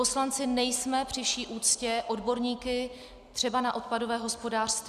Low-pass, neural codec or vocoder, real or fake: 14.4 kHz; none; real